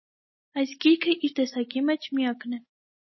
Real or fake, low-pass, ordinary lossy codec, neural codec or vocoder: real; 7.2 kHz; MP3, 24 kbps; none